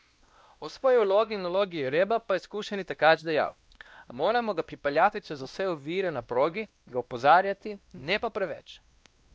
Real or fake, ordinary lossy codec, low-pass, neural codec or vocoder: fake; none; none; codec, 16 kHz, 1 kbps, X-Codec, WavLM features, trained on Multilingual LibriSpeech